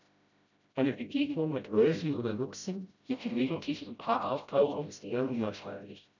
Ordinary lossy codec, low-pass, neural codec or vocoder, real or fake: none; 7.2 kHz; codec, 16 kHz, 0.5 kbps, FreqCodec, smaller model; fake